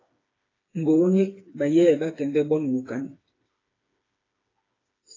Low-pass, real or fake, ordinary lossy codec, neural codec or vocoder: 7.2 kHz; fake; AAC, 32 kbps; codec, 16 kHz, 4 kbps, FreqCodec, smaller model